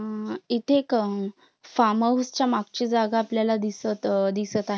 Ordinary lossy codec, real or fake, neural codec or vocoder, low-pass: none; real; none; none